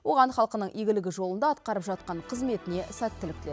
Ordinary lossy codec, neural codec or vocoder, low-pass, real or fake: none; none; none; real